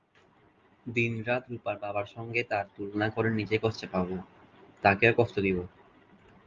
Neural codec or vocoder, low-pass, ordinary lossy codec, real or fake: none; 7.2 kHz; Opus, 32 kbps; real